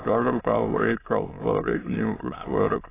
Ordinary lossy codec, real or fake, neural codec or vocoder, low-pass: AAC, 16 kbps; fake; autoencoder, 22.05 kHz, a latent of 192 numbers a frame, VITS, trained on many speakers; 3.6 kHz